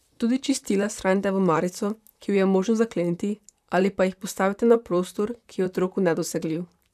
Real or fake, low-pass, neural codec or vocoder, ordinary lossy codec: fake; 14.4 kHz; vocoder, 44.1 kHz, 128 mel bands, Pupu-Vocoder; none